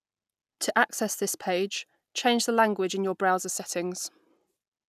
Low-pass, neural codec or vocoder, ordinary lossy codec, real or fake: 14.4 kHz; none; none; real